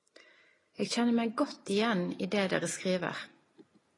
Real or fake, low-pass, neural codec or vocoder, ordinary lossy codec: real; 10.8 kHz; none; AAC, 32 kbps